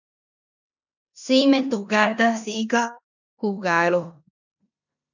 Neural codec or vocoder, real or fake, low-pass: codec, 16 kHz in and 24 kHz out, 0.9 kbps, LongCat-Audio-Codec, four codebook decoder; fake; 7.2 kHz